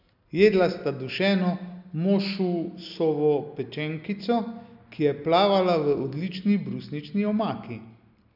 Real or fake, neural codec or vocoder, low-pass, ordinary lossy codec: real; none; 5.4 kHz; none